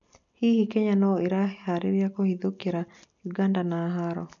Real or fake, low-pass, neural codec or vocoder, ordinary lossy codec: real; 7.2 kHz; none; none